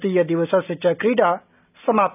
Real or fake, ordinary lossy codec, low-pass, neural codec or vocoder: real; none; 3.6 kHz; none